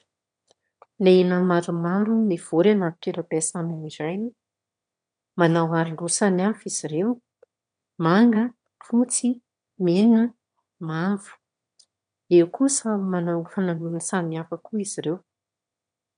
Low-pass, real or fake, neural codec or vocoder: 9.9 kHz; fake; autoencoder, 22.05 kHz, a latent of 192 numbers a frame, VITS, trained on one speaker